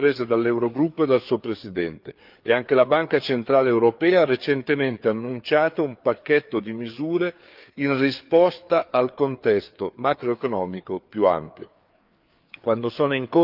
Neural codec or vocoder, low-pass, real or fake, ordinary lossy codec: codec, 16 kHz, 4 kbps, FreqCodec, larger model; 5.4 kHz; fake; Opus, 24 kbps